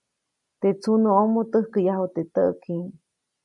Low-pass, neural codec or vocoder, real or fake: 10.8 kHz; none; real